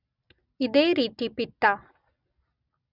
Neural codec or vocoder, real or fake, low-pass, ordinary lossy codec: none; real; 5.4 kHz; none